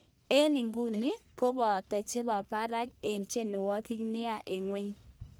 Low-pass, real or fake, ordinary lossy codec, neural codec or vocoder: none; fake; none; codec, 44.1 kHz, 1.7 kbps, Pupu-Codec